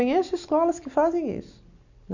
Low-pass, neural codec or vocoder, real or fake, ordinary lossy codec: 7.2 kHz; none; real; none